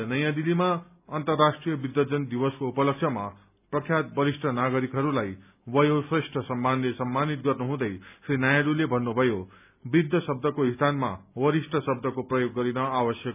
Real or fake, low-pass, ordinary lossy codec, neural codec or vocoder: real; 3.6 kHz; none; none